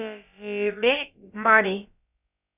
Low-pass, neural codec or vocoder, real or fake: 3.6 kHz; codec, 16 kHz, about 1 kbps, DyCAST, with the encoder's durations; fake